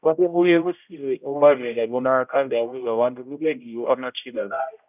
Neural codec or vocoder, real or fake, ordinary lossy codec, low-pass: codec, 16 kHz, 0.5 kbps, X-Codec, HuBERT features, trained on general audio; fake; none; 3.6 kHz